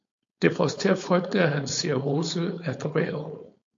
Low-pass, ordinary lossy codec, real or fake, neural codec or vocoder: 7.2 kHz; MP3, 48 kbps; fake; codec, 16 kHz, 4.8 kbps, FACodec